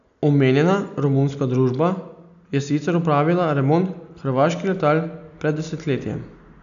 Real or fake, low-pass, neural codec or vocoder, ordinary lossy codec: real; 7.2 kHz; none; none